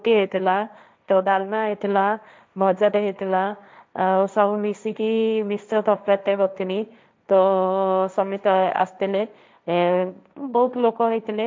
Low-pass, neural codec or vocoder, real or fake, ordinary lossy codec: none; codec, 16 kHz, 1.1 kbps, Voila-Tokenizer; fake; none